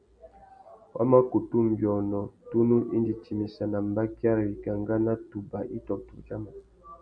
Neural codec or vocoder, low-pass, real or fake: none; 9.9 kHz; real